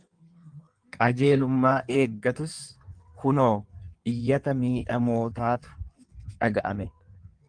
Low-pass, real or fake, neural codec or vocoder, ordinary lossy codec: 9.9 kHz; fake; codec, 16 kHz in and 24 kHz out, 1.1 kbps, FireRedTTS-2 codec; Opus, 32 kbps